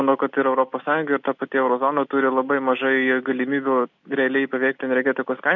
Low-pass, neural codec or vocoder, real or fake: 7.2 kHz; none; real